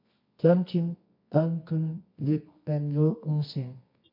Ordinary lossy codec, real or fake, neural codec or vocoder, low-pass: MP3, 32 kbps; fake; codec, 24 kHz, 0.9 kbps, WavTokenizer, medium music audio release; 5.4 kHz